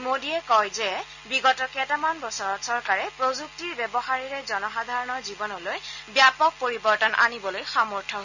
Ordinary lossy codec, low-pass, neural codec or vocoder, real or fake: none; 7.2 kHz; none; real